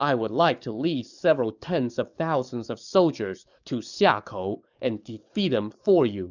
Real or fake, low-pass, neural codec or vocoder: fake; 7.2 kHz; codec, 44.1 kHz, 7.8 kbps, DAC